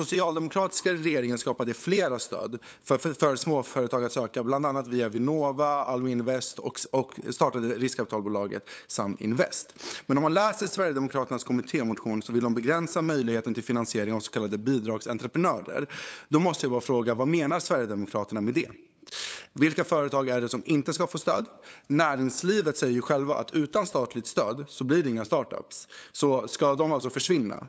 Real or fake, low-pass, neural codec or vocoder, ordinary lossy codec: fake; none; codec, 16 kHz, 8 kbps, FunCodec, trained on LibriTTS, 25 frames a second; none